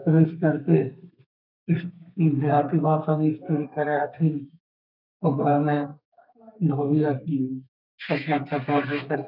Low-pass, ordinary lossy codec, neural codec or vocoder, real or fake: 5.4 kHz; none; codec, 32 kHz, 1.9 kbps, SNAC; fake